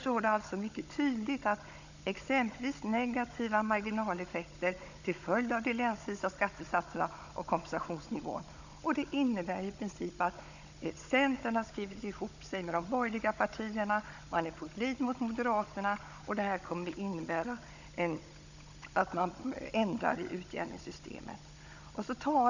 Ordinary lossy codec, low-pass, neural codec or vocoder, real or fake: none; 7.2 kHz; codec, 16 kHz, 16 kbps, FunCodec, trained on Chinese and English, 50 frames a second; fake